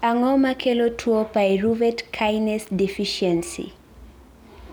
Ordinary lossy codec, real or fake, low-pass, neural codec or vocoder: none; real; none; none